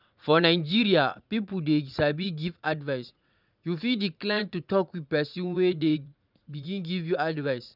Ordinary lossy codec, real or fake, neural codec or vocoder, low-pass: none; fake; vocoder, 44.1 kHz, 80 mel bands, Vocos; 5.4 kHz